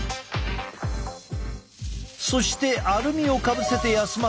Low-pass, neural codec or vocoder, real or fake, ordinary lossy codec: none; none; real; none